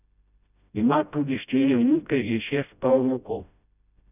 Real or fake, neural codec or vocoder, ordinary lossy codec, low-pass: fake; codec, 16 kHz, 0.5 kbps, FreqCodec, smaller model; none; 3.6 kHz